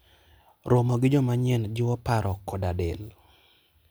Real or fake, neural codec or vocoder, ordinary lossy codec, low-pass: real; none; none; none